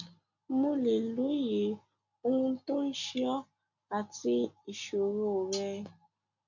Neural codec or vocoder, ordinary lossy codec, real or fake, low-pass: none; none; real; 7.2 kHz